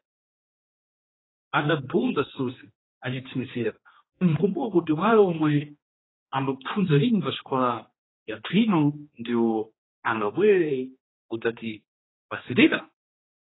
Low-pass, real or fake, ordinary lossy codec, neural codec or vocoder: 7.2 kHz; fake; AAC, 16 kbps; codec, 16 kHz, 2 kbps, X-Codec, HuBERT features, trained on general audio